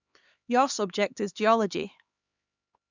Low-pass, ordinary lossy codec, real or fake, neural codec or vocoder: 7.2 kHz; Opus, 64 kbps; fake; codec, 16 kHz, 4 kbps, X-Codec, HuBERT features, trained on LibriSpeech